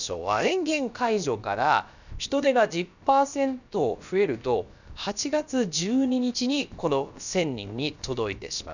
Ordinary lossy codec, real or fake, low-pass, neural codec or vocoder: none; fake; 7.2 kHz; codec, 16 kHz, about 1 kbps, DyCAST, with the encoder's durations